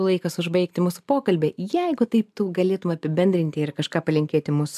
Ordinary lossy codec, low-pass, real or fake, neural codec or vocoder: AAC, 96 kbps; 14.4 kHz; real; none